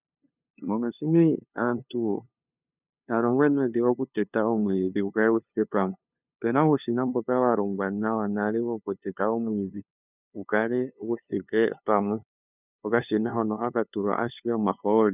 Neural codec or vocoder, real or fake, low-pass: codec, 16 kHz, 2 kbps, FunCodec, trained on LibriTTS, 25 frames a second; fake; 3.6 kHz